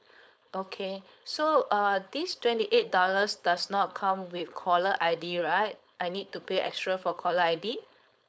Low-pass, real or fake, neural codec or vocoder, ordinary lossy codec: none; fake; codec, 16 kHz, 4.8 kbps, FACodec; none